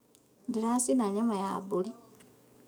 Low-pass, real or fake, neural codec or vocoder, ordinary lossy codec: none; fake; codec, 44.1 kHz, 7.8 kbps, DAC; none